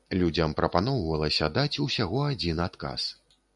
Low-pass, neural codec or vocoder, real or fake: 10.8 kHz; none; real